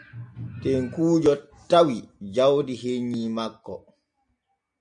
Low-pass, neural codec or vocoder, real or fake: 9.9 kHz; none; real